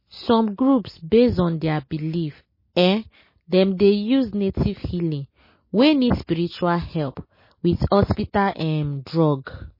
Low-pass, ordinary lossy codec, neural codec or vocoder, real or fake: 5.4 kHz; MP3, 24 kbps; none; real